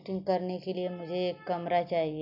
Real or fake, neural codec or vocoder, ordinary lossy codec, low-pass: real; none; none; 5.4 kHz